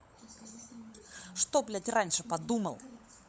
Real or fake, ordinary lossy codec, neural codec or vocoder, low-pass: fake; none; codec, 16 kHz, 16 kbps, FunCodec, trained on LibriTTS, 50 frames a second; none